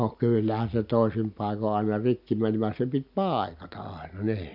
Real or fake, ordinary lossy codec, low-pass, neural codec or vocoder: real; none; 5.4 kHz; none